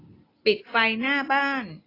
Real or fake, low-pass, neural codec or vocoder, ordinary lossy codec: real; 5.4 kHz; none; AAC, 24 kbps